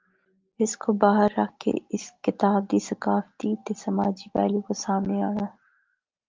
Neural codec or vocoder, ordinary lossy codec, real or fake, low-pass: none; Opus, 32 kbps; real; 7.2 kHz